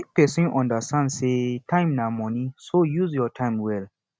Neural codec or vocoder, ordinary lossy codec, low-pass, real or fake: none; none; none; real